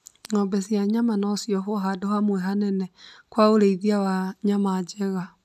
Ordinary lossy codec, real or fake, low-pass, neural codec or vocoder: none; real; 14.4 kHz; none